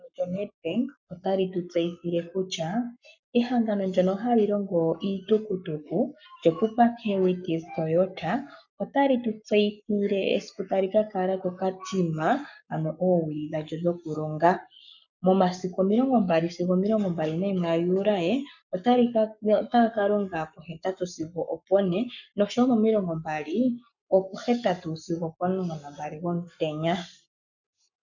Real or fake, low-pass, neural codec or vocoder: fake; 7.2 kHz; codec, 44.1 kHz, 7.8 kbps, Pupu-Codec